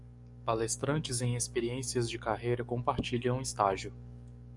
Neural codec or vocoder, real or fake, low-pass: codec, 44.1 kHz, 7.8 kbps, DAC; fake; 10.8 kHz